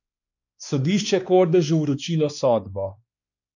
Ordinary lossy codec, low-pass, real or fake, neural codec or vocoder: none; 7.2 kHz; fake; codec, 16 kHz, 2 kbps, X-Codec, WavLM features, trained on Multilingual LibriSpeech